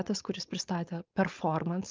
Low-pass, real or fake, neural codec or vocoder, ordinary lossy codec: 7.2 kHz; fake; codec, 16 kHz, 4.8 kbps, FACodec; Opus, 32 kbps